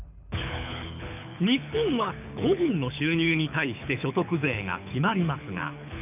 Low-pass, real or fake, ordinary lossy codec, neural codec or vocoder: 3.6 kHz; fake; none; codec, 24 kHz, 6 kbps, HILCodec